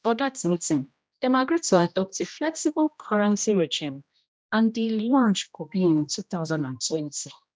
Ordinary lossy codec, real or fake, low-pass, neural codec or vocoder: none; fake; none; codec, 16 kHz, 1 kbps, X-Codec, HuBERT features, trained on general audio